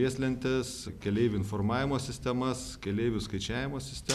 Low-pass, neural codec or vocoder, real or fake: 14.4 kHz; none; real